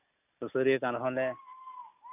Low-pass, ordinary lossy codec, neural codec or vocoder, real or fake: 3.6 kHz; none; none; real